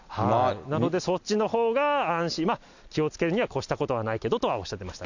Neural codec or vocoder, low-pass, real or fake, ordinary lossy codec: none; 7.2 kHz; real; none